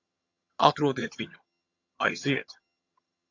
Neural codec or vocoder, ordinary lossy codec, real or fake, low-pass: vocoder, 22.05 kHz, 80 mel bands, HiFi-GAN; AAC, 48 kbps; fake; 7.2 kHz